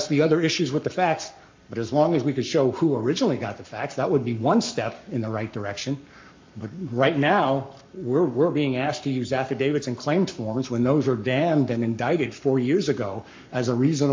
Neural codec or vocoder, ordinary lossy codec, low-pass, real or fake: codec, 44.1 kHz, 7.8 kbps, Pupu-Codec; MP3, 48 kbps; 7.2 kHz; fake